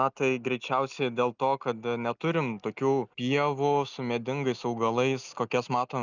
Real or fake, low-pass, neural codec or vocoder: real; 7.2 kHz; none